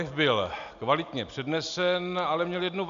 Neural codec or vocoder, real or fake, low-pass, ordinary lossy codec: none; real; 7.2 kHz; MP3, 64 kbps